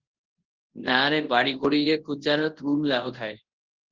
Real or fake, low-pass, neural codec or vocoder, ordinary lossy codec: fake; 7.2 kHz; codec, 24 kHz, 0.9 kbps, WavTokenizer, large speech release; Opus, 16 kbps